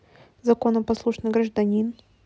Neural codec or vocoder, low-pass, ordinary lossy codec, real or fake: none; none; none; real